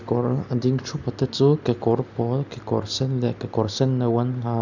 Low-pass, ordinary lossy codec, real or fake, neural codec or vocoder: 7.2 kHz; none; real; none